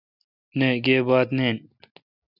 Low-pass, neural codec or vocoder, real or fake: 5.4 kHz; none; real